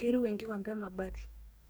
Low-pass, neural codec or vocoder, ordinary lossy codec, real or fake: none; codec, 44.1 kHz, 2.6 kbps, DAC; none; fake